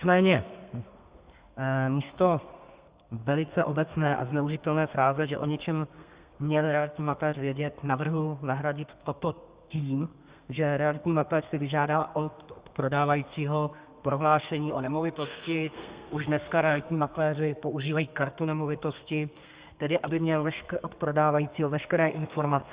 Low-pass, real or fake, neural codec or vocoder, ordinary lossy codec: 3.6 kHz; fake; codec, 32 kHz, 1.9 kbps, SNAC; Opus, 64 kbps